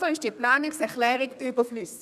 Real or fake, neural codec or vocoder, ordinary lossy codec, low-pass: fake; codec, 32 kHz, 1.9 kbps, SNAC; none; 14.4 kHz